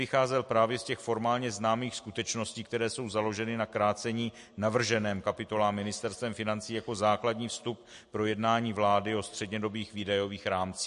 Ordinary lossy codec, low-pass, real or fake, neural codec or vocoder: MP3, 48 kbps; 10.8 kHz; real; none